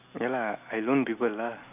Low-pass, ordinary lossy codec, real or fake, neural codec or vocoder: 3.6 kHz; none; real; none